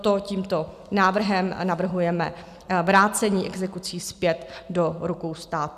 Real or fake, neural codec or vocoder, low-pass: real; none; 14.4 kHz